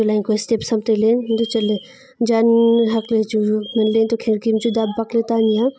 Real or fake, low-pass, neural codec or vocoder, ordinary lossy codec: real; none; none; none